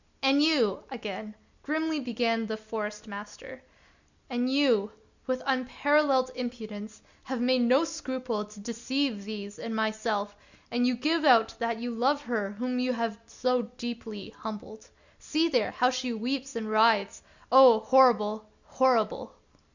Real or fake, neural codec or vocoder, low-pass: real; none; 7.2 kHz